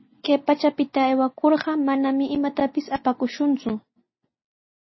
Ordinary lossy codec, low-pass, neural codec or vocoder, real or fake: MP3, 24 kbps; 7.2 kHz; none; real